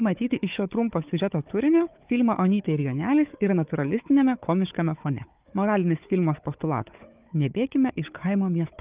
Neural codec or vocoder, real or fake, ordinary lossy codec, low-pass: codec, 16 kHz, 4 kbps, FunCodec, trained on Chinese and English, 50 frames a second; fake; Opus, 24 kbps; 3.6 kHz